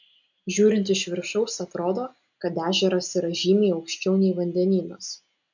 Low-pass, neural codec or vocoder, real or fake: 7.2 kHz; none; real